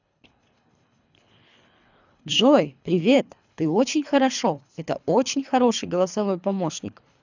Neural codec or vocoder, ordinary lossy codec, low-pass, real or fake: codec, 24 kHz, 3 kbps, HILCodec; none; 7.2 kHz; fake